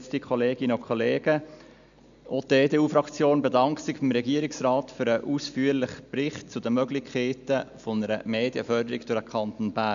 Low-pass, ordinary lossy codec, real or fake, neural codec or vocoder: 7.2 kHz; none; real; none